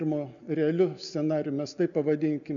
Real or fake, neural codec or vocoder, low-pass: real; none; 7.2 kHz